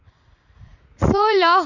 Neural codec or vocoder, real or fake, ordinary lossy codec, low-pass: vocoder, 44.1 kHz, 80 mel bands, Vocos; fake; none; 7.2 kHz